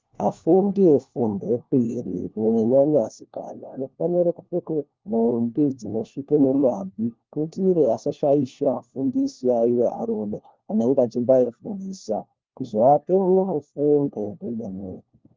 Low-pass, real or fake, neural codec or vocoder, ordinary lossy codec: 7.2 kHz; fake; codec, 16 kHz, 1 kbps, FunCodec, trained on LibriTTS, 50 frames a second; Opus, 24 kbps